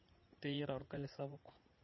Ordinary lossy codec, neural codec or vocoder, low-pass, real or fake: MP3, 24 kbps; vocoder, 44.1 kHz, 80 mel bands, Vocos; 7.2 kHz; fake